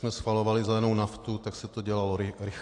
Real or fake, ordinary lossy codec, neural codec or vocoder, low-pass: real; AAC, 48 kbps; none; 10.8 kHz